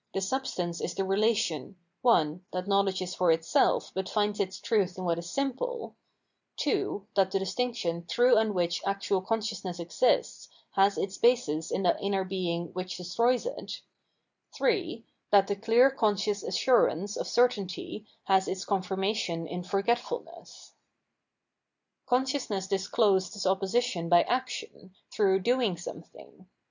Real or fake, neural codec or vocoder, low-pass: real; none; 7.2 kHz